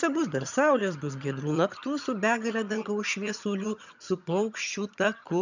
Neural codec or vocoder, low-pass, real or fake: vocoder, 22.05 kHz, 80 mel bands, HiFi-GAN; 7.2 kHz; fake